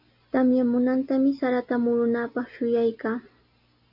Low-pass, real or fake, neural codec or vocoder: 5.4 kHz; real; none